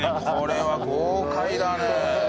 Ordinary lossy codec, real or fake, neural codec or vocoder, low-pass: none; real; none; none